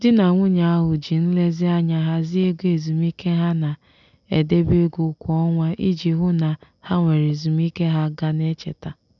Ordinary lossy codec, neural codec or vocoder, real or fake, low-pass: none; none; real; 7.2 kHz